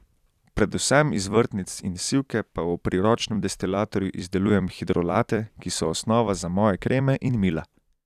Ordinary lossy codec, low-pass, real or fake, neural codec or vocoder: none; 14.4 kHz; fake; vocoder, 44.1 kHz, 128 mel bands every 256 samples, BigVGAN v2